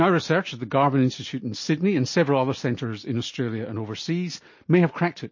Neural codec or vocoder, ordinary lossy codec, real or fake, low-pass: none; MP3, 32 kbps; real; 7.2 kHz